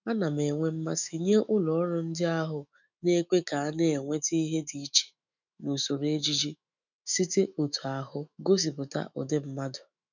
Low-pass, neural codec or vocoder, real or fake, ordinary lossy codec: 7.2 kHz; autoencoder, 48 kHz, 128 numbers a frame, DAC-VAE, trained on Japanese speech; fake; none